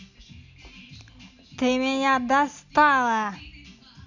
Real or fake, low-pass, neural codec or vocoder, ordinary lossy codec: real; 7.2 kHz; none; none